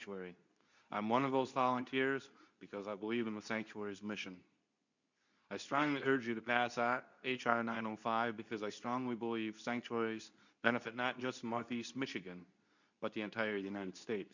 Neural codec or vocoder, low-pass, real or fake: codec, 24 kHz, 0.9 kbps, WavTokenizer, medium speech release version 2; 7.2 kHz; fake